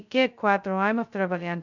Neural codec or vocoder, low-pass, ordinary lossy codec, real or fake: codec, 16 kHz, 0.2 kbps, FocalCodec; 7.2 kHz; none; fake